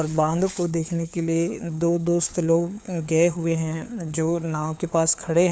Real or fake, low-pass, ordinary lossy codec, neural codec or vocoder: fake; none; none; codec, 16 kHz, 4 kbps, FunCodec, trained on LibriTTS, 50 frames a second